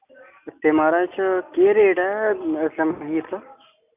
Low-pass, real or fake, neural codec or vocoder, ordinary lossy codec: 3.6 kHz; real; none; none